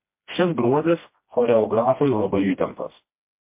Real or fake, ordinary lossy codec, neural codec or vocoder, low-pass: fake; MP3, 24 kbps; codec, 16 kHz, 1 kbps, FreqCodec, smaller model; 3.6 kHz